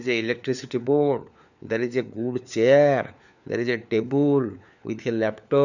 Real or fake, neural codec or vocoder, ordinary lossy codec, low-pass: fake; codec, 16 kHz, 4 kbps, FunCodec, trained on LibriTTS, 50 frames a second; none; 7.2 kHz